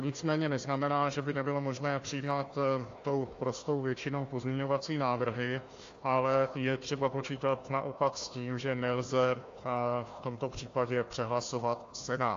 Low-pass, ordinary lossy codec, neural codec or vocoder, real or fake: 7.2 kHz; AAC, 48 kbps; codec, 16 kHz, 1 kbps, FunCodec, trained on Chinese and English, 50 frames a second; fake